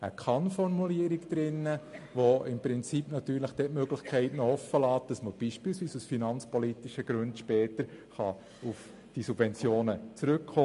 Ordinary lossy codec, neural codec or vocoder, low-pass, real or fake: MP3, 48 kbps; none; 14.4 kHz; real